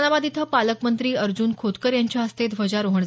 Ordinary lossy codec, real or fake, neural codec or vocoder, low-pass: none; real; none; none